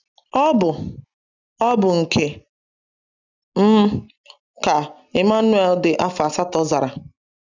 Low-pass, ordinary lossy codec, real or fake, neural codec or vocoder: 7.2 kHz; none; real; none